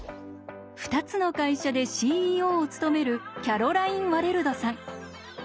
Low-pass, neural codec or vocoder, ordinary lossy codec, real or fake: none; none; none; real